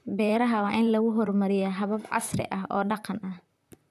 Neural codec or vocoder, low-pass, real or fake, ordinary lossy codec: vocoder, 44.1 kHz, 128 mel bands, Pupu-Vocoder; 14.4 kHz; fake; none